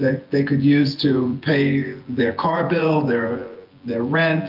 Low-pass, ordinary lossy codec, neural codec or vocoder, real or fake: 5.4 kHz; Opus, 24 kbps; vocoder, 24 kHz, 100 mel bands, Vocos; fake